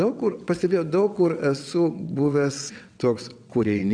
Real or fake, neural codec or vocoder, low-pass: fake; vocoder, 22.05 kHz, 80 mel bands, WaveNeXt; 9.9 kHz